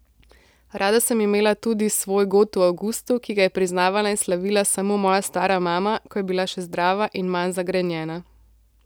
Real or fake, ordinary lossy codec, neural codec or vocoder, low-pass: real; none; none; none